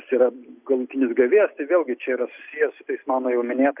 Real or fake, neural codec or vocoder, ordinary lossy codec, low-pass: real; none; Opus, 64 kbps; 3.6 kHz